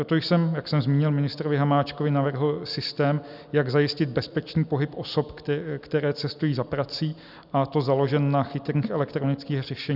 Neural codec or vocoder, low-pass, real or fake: none; 5.4 kHz; real